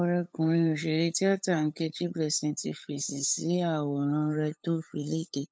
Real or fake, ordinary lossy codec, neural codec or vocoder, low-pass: fake; none; codec, 16 kHz, 8 kbps, FunCodec, trained on LibriTTS, 25 frames a second; none